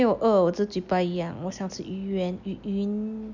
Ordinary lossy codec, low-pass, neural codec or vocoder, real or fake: none; 7.2 kHz; none; real